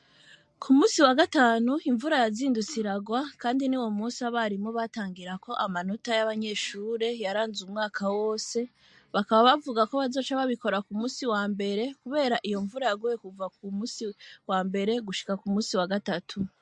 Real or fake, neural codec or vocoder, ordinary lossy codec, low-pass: real; none; MP3, 48 kbps; 10.8 kHz